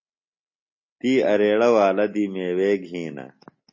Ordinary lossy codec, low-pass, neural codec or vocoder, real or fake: MP3, 32 kbps; 7.2 kHz; none; real